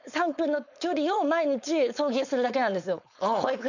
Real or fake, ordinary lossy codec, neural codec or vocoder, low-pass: fake; none; codec, 16 kHz, 4.8 kbps, FACodec; 7.2 kHz